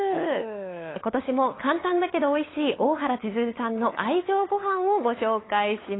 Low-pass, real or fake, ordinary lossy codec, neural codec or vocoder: 7.2 kHz; fake; AAC, 16 kbps; codec, 16 kHz, 8 kbps, FunCodec, trained on LibriTTS, 25 frames a second